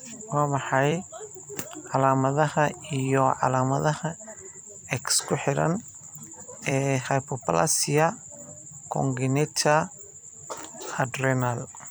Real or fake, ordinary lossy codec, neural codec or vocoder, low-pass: fake; none; vocoder, 44.1 kHz, 128 mel bands every 256 samples, BigVGAN v2; none